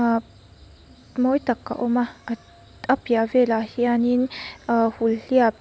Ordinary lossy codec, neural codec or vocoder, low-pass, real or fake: none; none; none; real